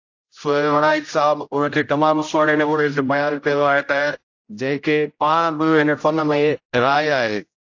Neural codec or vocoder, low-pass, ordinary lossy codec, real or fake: codec, 16 kHz, 0.5 kbps, X-Codec, HuBERT features, trained on general audio; 7.2 kHz; AAC, 48 kbps; fake